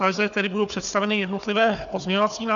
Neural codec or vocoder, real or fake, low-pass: codec, 16 kHz, 4 kbps, FunCodec, trained on Chinese and English, 50 frames a second; fake; 7.2 kHz